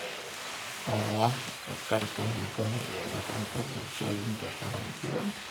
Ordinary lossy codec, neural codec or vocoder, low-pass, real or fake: none; codec, 44.1 kHz, 1.7 kbps, Pupu-Codec; none; fake